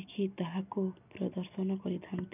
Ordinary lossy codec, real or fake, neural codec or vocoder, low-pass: none; real; none; 3.6 kHz